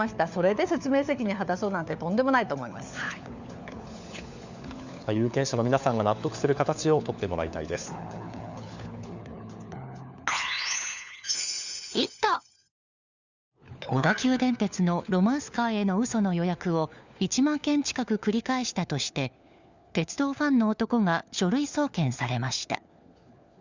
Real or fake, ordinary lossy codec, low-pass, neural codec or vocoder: fake; Opus, 64 kbps; 7.2 kHz; codec, 16 kHz, 4 kbps, FunCodec, trained on LibriTTS, 50 frames a second